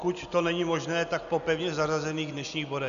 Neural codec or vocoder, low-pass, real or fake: none; 7.2 kHz; real